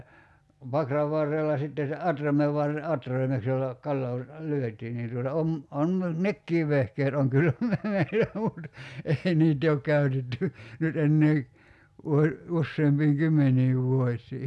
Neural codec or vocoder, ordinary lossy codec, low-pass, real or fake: none; none; none; real